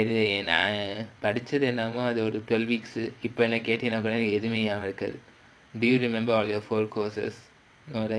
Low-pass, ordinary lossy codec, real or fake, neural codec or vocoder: none; none; fake; vocoder, 22.05 kHz, 80 mel bands, WaveNeXt